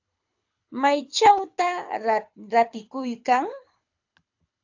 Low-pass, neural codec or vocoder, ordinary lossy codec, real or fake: 7.2 kHz; codec, 24 kHz, 6 kbps, HILCodec; AAC, 48 kbps; fake